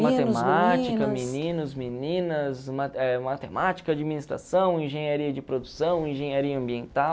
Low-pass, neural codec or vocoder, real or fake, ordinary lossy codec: none; none; real; none